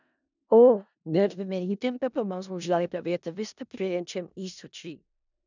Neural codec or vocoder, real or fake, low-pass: codec, 16 kHz in and 24 kHz out, 0.4 kbps, LongCat-Audio-Codec, four codebook decoder; fake; 7.2 kHz